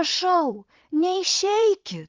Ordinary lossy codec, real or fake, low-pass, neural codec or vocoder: Opus, 32 kbps; fake; 7.2 kHz; vocoder, 22.05 kHz, 80 mel bands, Vocos